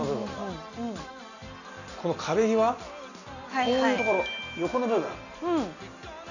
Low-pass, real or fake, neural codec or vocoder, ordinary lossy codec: 7.2 kHz; real; none; none